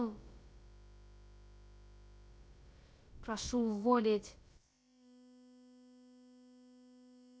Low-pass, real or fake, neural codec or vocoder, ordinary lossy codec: none; fake; codec, 16 kHz, about 1 kbps, DyCAST, with the encoder's durations; none